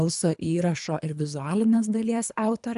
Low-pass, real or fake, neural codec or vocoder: 10.8 kHz; fake; codec, 24 kHz, 3 kbps, HILCodec